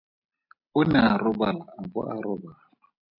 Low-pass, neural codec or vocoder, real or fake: 5.4 kHz; none; real